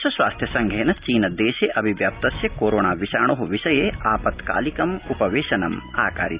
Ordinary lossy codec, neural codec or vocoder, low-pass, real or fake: Opus, 64 kbps; none; 3.6 kHz; real